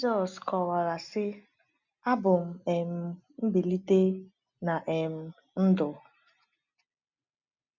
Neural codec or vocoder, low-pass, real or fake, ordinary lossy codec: none; 7.2 kHz; real; none